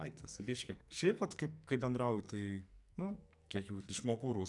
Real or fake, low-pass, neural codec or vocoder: fake; 10.8 kHz; codec, 44.1 kHz, 2.6 kbps, SNAC